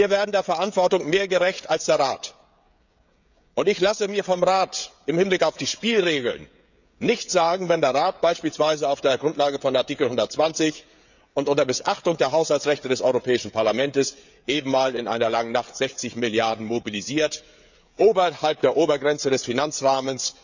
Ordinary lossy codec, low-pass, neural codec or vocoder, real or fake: none; 7.2 kHz; codec, 16 kHz, 16 kbps, FreqCodec, smaller model; fake